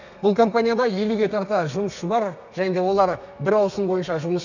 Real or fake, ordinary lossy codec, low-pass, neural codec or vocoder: fake; none; 7.2 kHz; codec, 32 kHz, 1.9 kbps, SNAC